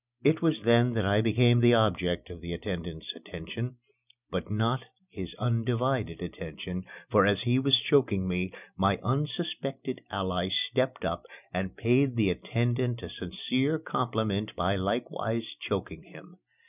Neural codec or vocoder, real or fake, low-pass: none; real; 3.6 kHz